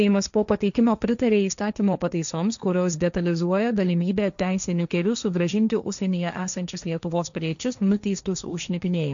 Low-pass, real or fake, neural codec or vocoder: 7.2 kHz; fake; codec, 16 kHz, 1.1 kbps, Voila-Tokenizer